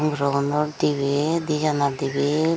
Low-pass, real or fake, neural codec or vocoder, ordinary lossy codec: none; real; none; none